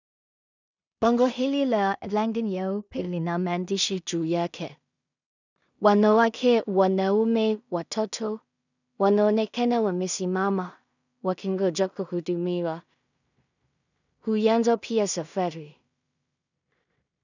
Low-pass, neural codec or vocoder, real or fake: 7.2 kHz; codec, 16 kHz in and 24 kHz out, 0.4 kbps, LongCat-Audio-Codec, two codebook decoder; fake